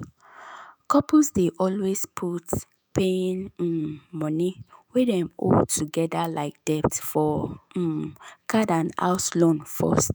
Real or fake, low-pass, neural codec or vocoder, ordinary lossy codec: fake; none; autoencoder, 48 kHz, 128 numbers a frame, DAC-VAE, trained on Japanese speech; none